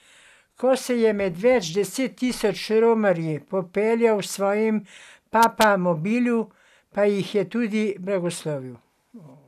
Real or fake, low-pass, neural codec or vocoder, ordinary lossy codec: real; 14.4 kHz; none; none